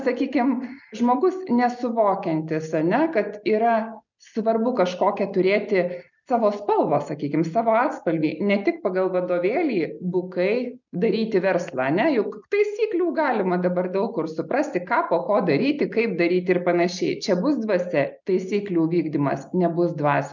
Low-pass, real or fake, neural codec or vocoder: 7.2 kHz; real; none